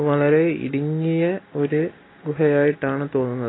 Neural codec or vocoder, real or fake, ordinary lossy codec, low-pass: none; real; AAC, 16 kbps; 7.2 kHz